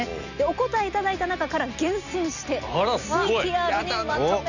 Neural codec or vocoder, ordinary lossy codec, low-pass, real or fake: none; none; 7.2 kHz; real